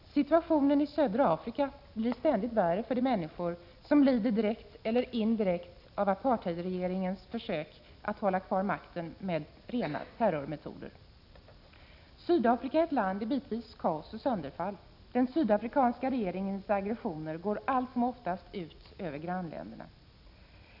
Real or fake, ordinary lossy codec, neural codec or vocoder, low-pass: real; none; none; 5.4 kHz